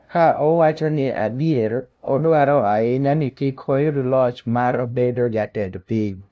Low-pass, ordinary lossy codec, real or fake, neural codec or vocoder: none; none; fake; codec, 16 kHz, 0.5 kbps, FunCodec, trained on LibriTTS, 25 frames a second